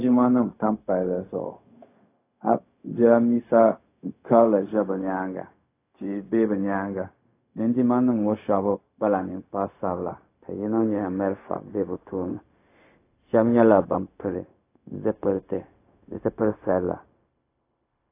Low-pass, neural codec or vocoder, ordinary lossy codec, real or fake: 3.6 kHz; codec, 16 kHz, 0.4 kbps, LongCat-Audio-Codec; AAC, 24 kbps; fake